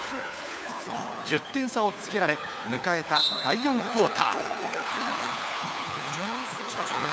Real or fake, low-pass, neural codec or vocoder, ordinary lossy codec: fake; none; codec, 16 kHz, 4 kbps, FunCodec, trained on LibriTTS, 50 frames a second; none